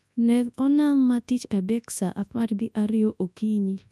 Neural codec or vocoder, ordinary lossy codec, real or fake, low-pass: codec, 24 kHz, 0.9 kbps, WavTokenizer, large speech release; none; fake; none